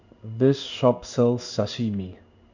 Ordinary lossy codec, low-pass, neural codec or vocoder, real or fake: none; 7.2 kHz; codec, 16 kHz in and 24 kHz out, 1 kbps, XY-Tokenizer; fake